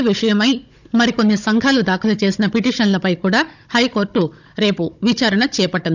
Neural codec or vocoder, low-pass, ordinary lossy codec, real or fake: codec, 16 kHz, 16 kbps, FunCodec, trained on Chinese and English, 50 frames a second; 7.2 kHz; none; fake